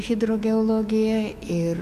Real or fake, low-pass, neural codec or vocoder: fake; 14.4 kHz; autoencoder, 48 kHz, 128 numbers a frame, DAC-VAE, trained on Japanese speech